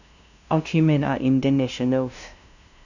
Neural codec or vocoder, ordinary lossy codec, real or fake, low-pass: codec, 16 kHz, 0.5 kbps, FunCodec, trained on LibriTTS, 25 frames a second; none; fake; 7.2 kHz